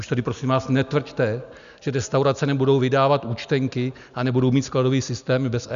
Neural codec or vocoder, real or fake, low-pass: none; real; 7.2 kHz